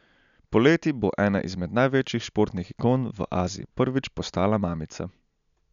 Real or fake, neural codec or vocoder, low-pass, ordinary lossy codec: real; none; 7.2 kHz; none